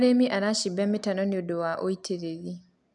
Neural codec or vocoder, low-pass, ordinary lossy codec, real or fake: none; 10.8 kHz; none; real